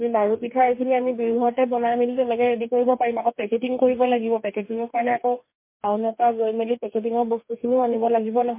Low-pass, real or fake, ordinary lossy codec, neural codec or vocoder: 3.6 kHz; fake; MP3, 24 kbps; codec, 44.1 kHz, 2.6 kbps, DAC